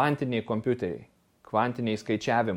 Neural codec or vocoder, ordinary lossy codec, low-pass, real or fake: none; MP3, 64 kbps; 19.8 kHz; real